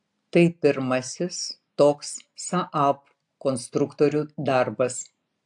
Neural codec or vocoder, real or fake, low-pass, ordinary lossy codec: none; real; 10.8 kHz; AAC, 64 kbps